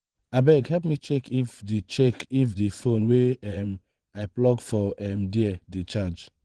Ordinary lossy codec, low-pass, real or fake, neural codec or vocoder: Opus, 16 kbps; 9.9 kHz; fake; vocoder, 22.05 kHz, 80 mel bands, Vocos